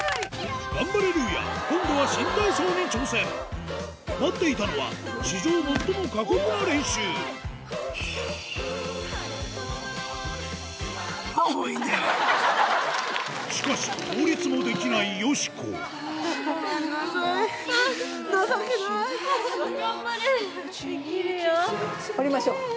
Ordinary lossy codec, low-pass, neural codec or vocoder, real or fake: none; none; none; real